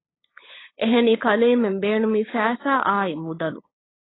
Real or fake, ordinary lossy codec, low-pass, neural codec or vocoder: fake; AAC, 16 kbps; 7.2 kHz; codec, 16 kHz, 8 kbps, FunCodec, trained on LibriTTS, 25 frames a second